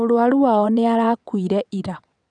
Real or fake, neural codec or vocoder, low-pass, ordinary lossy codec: fake; vocoder, 22.05 kHz, 80 mel bands, WaveNeXt; 9.9 kHz; none